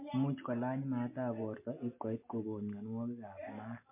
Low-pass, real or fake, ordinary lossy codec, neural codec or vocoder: 3.6 kHz; real; MP3, 24 kbps; none